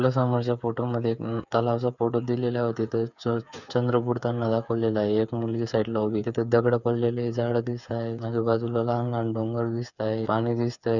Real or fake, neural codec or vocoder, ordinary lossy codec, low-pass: fake; codec, 16 kHz, 8 kbps, FreqCodec, smaller model; none; 7.2 kHz